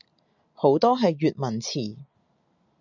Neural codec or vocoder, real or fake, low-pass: none; real; 7.2 kHz